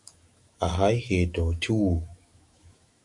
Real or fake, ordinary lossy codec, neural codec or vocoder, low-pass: fake; Opus, 64 kbps; autoencoder, 48 kHz, 128 numbers a frame, DAC-VAE, trained on Japanese speech; 10.8 kHz